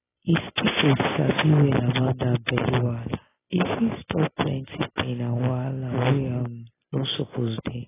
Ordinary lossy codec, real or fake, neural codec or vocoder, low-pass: AAC, 16 kbps; real; none; 3.6 kHz